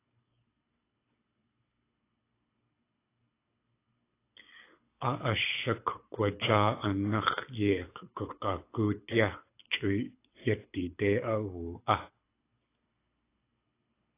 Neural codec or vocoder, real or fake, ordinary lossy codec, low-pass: codec, 24 kHz, 6 kbps, HILCodec; fake; AAC, 24 kbps; 3.6 kHz